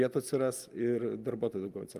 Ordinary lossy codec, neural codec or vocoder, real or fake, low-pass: Opus, 32 kbps; none; real; 14.4 kHz